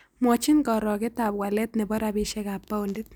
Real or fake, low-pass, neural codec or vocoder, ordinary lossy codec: real; none; none; none